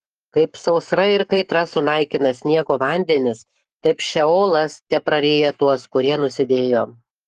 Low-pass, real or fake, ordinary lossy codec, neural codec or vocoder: 14.4 kHz; fake; Opus, 24 kbps; codec, 44.1 kHz, 7.8 kbps, Pupu-Codec